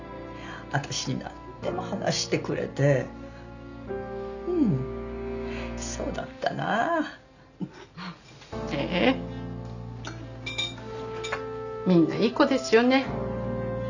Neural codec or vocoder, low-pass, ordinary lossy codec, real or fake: none; 7.2 kHz; none; real